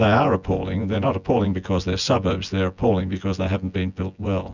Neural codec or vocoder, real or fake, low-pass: vocoder, 24 kHz, 100 mel bands, Vocos; fake; 7.2 kHz